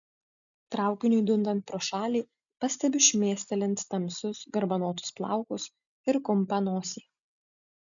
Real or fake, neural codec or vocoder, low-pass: real; none; 7.2 kHz